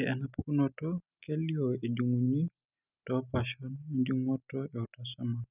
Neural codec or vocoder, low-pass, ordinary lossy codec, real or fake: none; 3.6 kHz; none; real